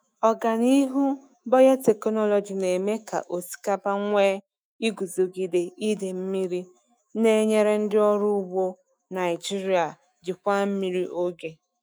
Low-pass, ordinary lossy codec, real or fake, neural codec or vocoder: none; none; fake; autoencoder, 48 kHz, 128 numbers a frame, DAC-VAE, trained on Japanese speech